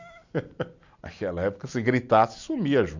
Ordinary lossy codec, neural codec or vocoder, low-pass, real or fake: none; none; 7.2 kHz; real